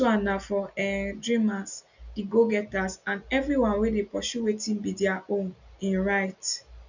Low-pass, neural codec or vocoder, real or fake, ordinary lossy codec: 7.2 kHz; none; real; none